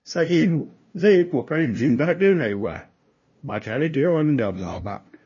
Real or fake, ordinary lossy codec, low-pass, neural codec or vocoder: fake; MP3, 32 kbps; 7.2 kHz; codec, 16 kHz, 0.5 kbps, FunCodec, trained on LibriTTS, 25 frames a second